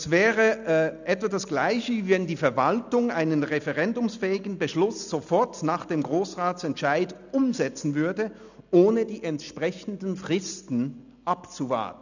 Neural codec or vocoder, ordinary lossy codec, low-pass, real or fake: none; none; 7.2 kHz; real